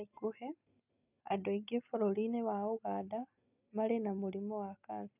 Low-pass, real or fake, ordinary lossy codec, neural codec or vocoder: 3.6 kHz; real; none; none